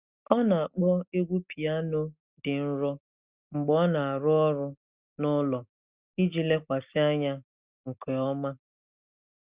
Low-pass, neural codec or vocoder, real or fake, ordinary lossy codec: 3.6 kHz; none; real; Opus, 64 kbps